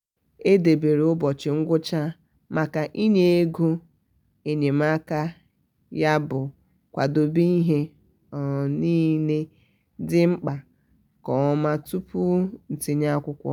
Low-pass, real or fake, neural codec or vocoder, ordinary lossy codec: 19.8 kHz; real; none; none